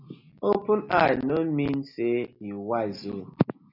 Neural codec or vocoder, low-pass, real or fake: none; 5.4 kHz; real